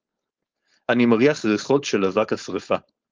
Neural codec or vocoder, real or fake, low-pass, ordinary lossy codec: codec, 16 kHz, 4.8 kbps, FACodec; fake; 7.2 kHz; Opus, 32 kbps